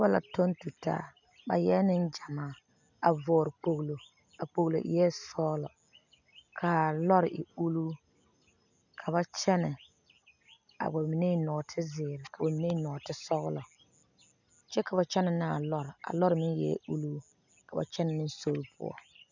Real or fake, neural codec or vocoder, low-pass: real; none; 7.2 kHz